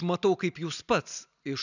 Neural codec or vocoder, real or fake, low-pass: none; real; 7.2 kHz